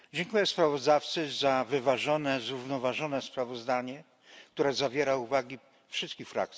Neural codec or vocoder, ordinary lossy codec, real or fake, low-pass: none; none; real; none